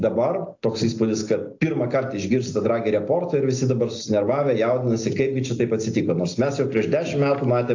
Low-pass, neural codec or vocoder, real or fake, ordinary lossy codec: 7.2 kHz; none; real; AAC, 48 kbps